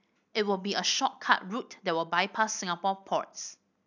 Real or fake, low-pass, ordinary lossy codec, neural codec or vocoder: real; 7.2 kHz; none; none